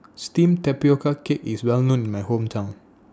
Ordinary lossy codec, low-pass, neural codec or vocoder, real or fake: none; none; none; real